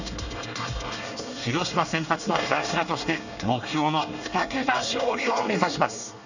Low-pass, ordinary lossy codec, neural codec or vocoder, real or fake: 7.2 kHz; none; codec, 24 kHz, 1 kbps, SNAC; fake